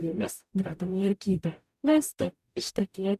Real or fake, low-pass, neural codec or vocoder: fake; 14.4 kHz; codec, 44.1 kHz, 0.9 kbps, DAC